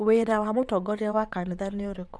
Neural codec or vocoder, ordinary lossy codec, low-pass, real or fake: vocoder, 22.05 kHz, 80 mel bands, WaveNeXt; none; none; fake